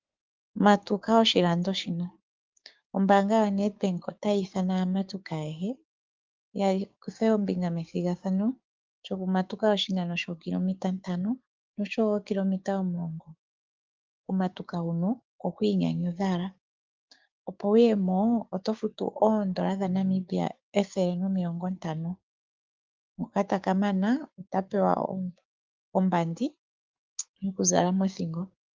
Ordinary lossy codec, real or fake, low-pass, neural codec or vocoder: Opus, 32 kbps; fake; 7.2 kHz; codec, 16 kHz, 6 kbps, DAC